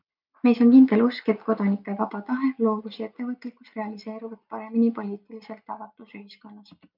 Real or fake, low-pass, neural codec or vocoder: real; 5.4 kHz; none